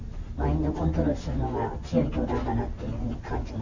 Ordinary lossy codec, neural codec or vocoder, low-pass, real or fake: none; none; 7.2 kHz; real